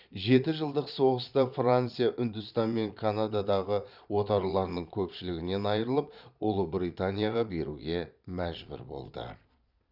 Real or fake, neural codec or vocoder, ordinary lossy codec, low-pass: fake; vocoder, 22.05 kHz, 80 mel bands, Vocos; none; 5.4 kHz